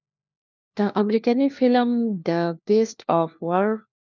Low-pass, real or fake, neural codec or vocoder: 7.2 kHz; fake; codec, 16 kHz, 1 kbps, FunCodec, trained on LibriTTS, 50 frames a second